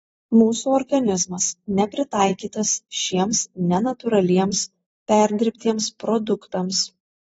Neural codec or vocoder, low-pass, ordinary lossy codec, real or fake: vocoder, 44.1 kHz, 128 mel bands every 256 samples, BigVGAN v2; 19.8 kHz; AAC, 24 kbps; fake